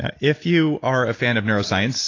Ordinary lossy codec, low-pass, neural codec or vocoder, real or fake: AAC, 32 kbps; 7.2 kHz; none; real